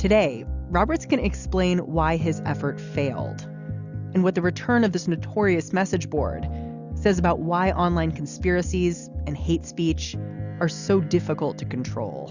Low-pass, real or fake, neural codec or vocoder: 7.2 kHz; real; none